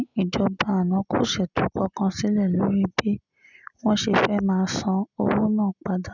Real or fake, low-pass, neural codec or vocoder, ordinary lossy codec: real; 7.2 kHz; none; none